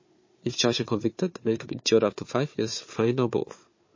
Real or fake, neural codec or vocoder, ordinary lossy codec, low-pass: fake; codec, 16 kHz, 4 kbps, FunCodec, trained on Chinese and English, 50 frames a second; MP3, 32 kbps; 7.2 kHz